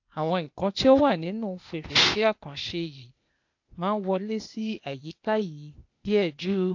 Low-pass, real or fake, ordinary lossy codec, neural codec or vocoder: 7.2 kHz; fake; AAC, 48 kbps; codec, 16 kHz, 0.8 kbps, ZipCodec